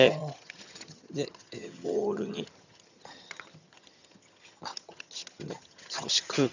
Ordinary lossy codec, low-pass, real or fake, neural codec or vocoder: none; 7.2 kHz; fake; vocoder, 22.05 kHz, 80 mel bands, HiFi-GAN